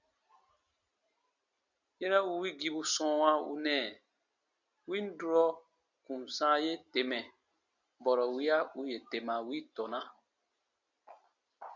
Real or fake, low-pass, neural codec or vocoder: real; 7.2 kHz; none